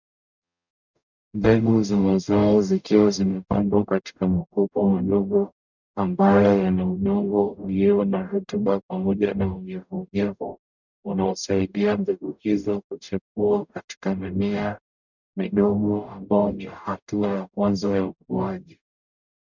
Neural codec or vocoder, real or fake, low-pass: codec, 44.1 kHz, 0.9 kbps, DAC; fake; 7.2 kHz